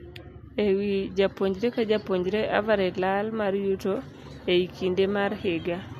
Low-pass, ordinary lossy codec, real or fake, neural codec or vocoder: 14.4 kHz; MP3, 64 kbps; real; none